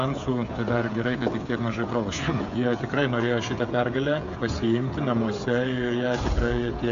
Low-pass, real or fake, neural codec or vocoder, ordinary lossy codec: 7.2 kHz; fake; codec, 16 kHz, 16 kbps, FreqCodec, smaller model; AAC, 64 kbps